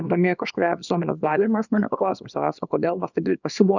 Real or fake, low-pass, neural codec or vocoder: fake; 7.2 kHz; codec, 24 kHz, 0.9 kbps, WavTokenizer, small release